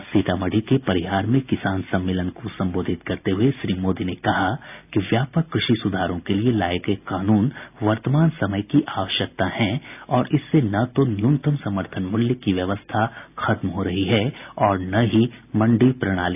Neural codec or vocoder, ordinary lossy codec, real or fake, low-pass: none; AAC, 32 kbps; real; 3.6 kHz